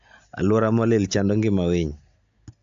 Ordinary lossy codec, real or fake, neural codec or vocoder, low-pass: AAC, 64 kbps; real; none; 7.2 kHz